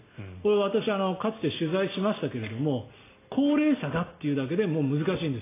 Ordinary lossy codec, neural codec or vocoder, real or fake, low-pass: AAC, 16 kbps; none; real; 3.6 kHz